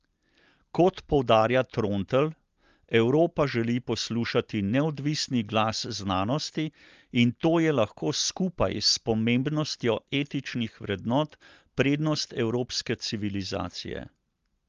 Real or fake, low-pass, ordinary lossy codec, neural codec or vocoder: real; 7.2 kHz; Opus, 32 kbps; none